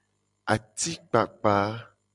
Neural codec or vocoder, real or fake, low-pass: none; real; 10.8 kHz